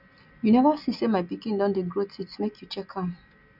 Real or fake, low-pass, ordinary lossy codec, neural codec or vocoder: real; 5.4 kHz; none; none